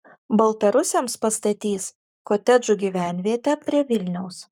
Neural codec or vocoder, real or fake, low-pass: codec, 44.1 kHz, 7.8 kbps, Pupu-Codec; fake; 19.8 kHz